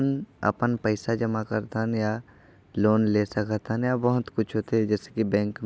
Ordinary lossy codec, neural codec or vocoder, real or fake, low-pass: none; none; real; none